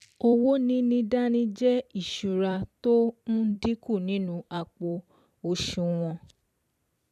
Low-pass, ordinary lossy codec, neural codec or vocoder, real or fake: 14.4 kHz; none; vocoder, 44.1 kHz, 128 mel bands every 256 samples, BigVGAN v2; fake